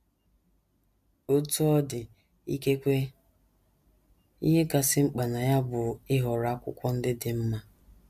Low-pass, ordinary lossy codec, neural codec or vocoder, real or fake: 14.4 kHz; MP3, 96 kbps; none; real